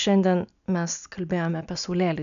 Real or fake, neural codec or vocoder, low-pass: real; none; 7.2 kHz